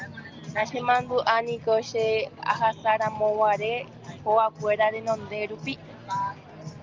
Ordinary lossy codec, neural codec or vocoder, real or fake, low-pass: Opus, 32 kbps; none; real; 7.2 kHz